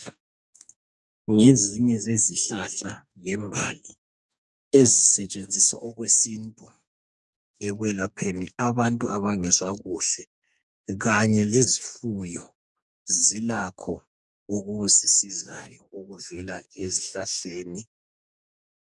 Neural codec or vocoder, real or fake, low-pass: codec, 44.1 kHz, 2.6 kbps, DAC; fake; 10.8 kHz